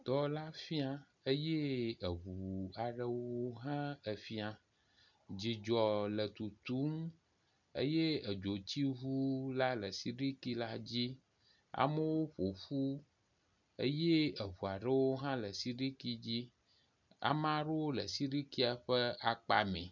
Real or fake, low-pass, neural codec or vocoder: real; 7.2 kHz; none